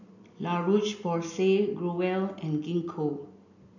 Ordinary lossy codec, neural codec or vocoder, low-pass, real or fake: none; none; 7.2 kHz; real